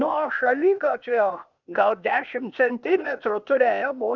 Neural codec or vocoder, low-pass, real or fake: codec, 16 kHz, 0.8 kbps, ZipCodec; 7.2 kHz; fake